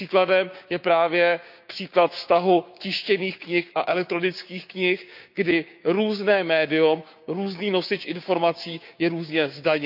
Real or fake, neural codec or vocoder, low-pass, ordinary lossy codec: fake; codec, 16 kHz, 6 kbps, DAC; 5.4 kHz; none